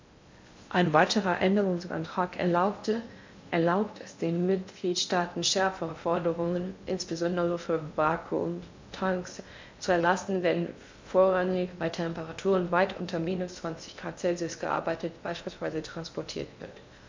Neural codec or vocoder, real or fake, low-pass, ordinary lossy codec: codec, 16 kHz in and 24 kHz out, 0.6 kbps, FocalCodec, streaming, 2048 codes; fake; 7.2 kHz; MP3, 64 kbps